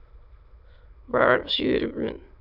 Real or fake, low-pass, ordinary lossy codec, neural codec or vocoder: fake; 5.4 kHz; AAC, 48 kbps; autoencoder, 22.05 kHz, a latent of 192 numbers a frame, VITS, trained on many speakers